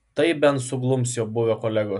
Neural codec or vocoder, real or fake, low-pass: none; real; 10.8 kHz